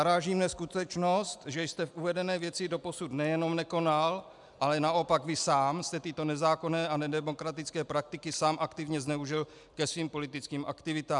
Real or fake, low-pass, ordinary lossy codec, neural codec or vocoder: real; 10.8 kHz; MP3, 96 kbps; none